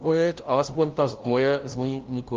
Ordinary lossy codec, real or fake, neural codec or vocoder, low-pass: Opus, 16 kbps; fake; codec, 16 kHz, 0.5 kbps, FunCodec, trained on LibriTTS, 25 frames a second; 7.2 kHz